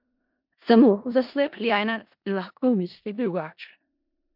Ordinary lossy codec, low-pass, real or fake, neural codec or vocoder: MP3, 48 kbps; 5.4 kHz; fake; codec, 16 kHz in and 24 kHz out, 0.4 kbps, LongCat-Audio-Codec, four codebook decoder